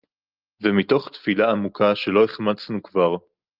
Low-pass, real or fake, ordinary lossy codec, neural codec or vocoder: 5.4 kHz; real; Opus, 24 kbps; none